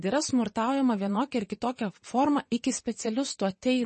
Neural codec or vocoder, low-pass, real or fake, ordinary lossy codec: vocoder, 22.05 kHz, 80 mel bands, WaveNeXt; 9.9 kHz; fake; MP3, 32 kbps